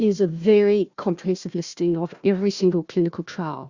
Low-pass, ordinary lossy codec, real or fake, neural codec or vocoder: 7.2 kHz; Opus, 64 kbps; fake; codec, 16 kHz, 1 kbps, FunCodec, trained on Chinese and English, 50 frames a second